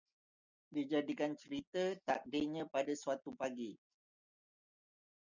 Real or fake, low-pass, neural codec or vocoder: real; 7.2 kHz; none